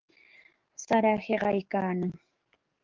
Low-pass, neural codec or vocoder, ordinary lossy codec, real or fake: 7.2 kHz; codec, 44.1 kHz, 7.8 kbps, DAC; Opus, 32 kbps; fake